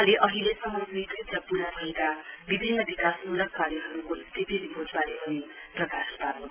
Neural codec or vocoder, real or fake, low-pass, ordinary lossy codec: none; real; 3.6 kHz; Opus, 32 kbps